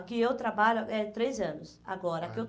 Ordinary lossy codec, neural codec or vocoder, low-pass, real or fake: none; none; none; real